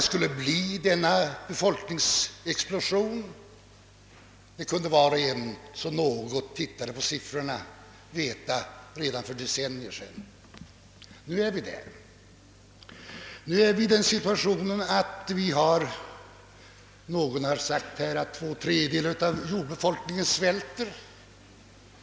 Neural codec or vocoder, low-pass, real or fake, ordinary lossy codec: none; none; real; none